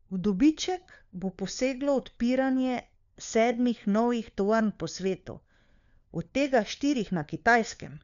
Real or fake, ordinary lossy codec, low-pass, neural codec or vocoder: fake; none; 7.2 kHz; codec, 16 kHz, 4 kbps, FunCodec, trained on LibriTTS, 50 frames a second